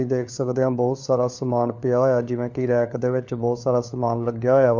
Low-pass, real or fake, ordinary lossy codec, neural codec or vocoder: 7.2 kHz; fake; none; codec, 16 kHz, 4 kbps, FunCodec, trained on LibriTTS, 50 frames a second